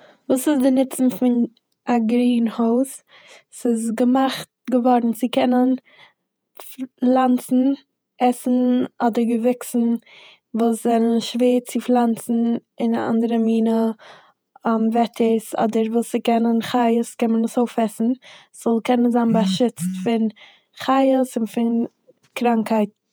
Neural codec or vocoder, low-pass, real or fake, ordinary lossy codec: vocoder, 48 kHz, 128 mel bands, Vocos; none; fake; none